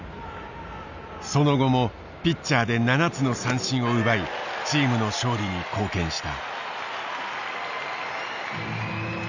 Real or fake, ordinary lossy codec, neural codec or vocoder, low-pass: real; none; none; 7.2 kHz